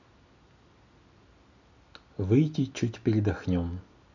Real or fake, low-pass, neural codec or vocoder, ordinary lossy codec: real; 7.2 kHz; none; none